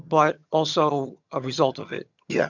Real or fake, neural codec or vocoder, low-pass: fake; vocoder, 22.05 kHz, 80 mel bands, HiFi-GAN; 7.2 kHz